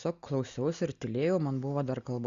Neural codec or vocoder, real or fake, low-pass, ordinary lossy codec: none; real; 7.2 kHz; Opus, 64 kbps